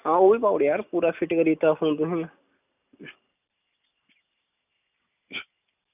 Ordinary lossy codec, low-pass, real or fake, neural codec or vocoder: none; 3.6 kHz; real; none